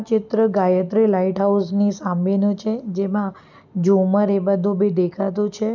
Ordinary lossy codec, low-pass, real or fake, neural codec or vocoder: none; 7.2 kHz; real; none